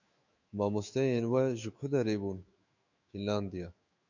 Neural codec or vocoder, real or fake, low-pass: autoencoder, 48 kHz, 128 numbers a frame, DAC-VAE, trained on Japanese speech; fake; 7.2 kHz